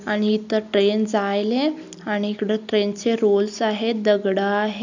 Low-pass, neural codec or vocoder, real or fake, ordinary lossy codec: 7.2 kHz; none; real; none